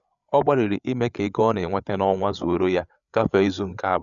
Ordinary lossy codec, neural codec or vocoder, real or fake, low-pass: none; codec, 16 kHz, 8 kbps, FreqCodec, larger model; fake; 7.2 kHz